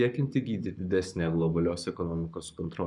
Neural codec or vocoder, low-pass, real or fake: codec, 44.1 kHz, 7.8 kbps, Pupu-Codec; 10.8 kHz; fake